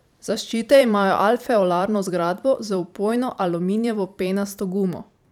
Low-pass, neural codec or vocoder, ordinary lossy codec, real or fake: 19.8 kHz; vocoder, 44.1 kHz, 128 mel bands every 512 samples, BigVGAN v2; none; fake